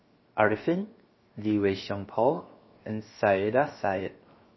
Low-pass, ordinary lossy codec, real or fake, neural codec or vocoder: 7.2 kHz; MP3, 24 kbps; fake; codec, 16 kHz, 0.7 kbps, FocalCodec